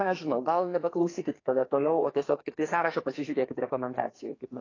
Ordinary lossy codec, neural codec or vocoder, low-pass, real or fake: AAC, 32 kbps; codec, 44.1 kHz, 2.6 kbps, SNAC; 7.2 kHz; fake